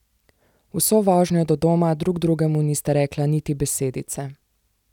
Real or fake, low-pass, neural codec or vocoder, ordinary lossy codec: real; 19.8 kHz; none; none